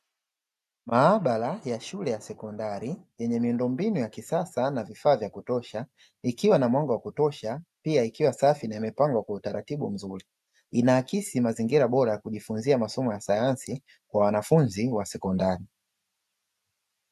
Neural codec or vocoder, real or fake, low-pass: none; real; 14.4 kHz